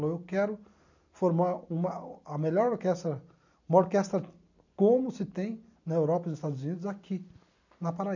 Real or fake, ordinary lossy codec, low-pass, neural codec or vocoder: fake; none; 7.2 kHz; vocoder, 44.1 kHz, 128 mel bands every 256 samples, BigVGAN v2